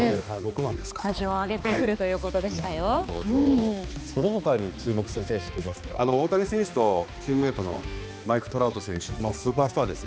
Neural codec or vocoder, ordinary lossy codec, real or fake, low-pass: codec, 16 kHz, 2 kbps, X-Codec, HuBERT features, trained on balanced general audio; none; fake; none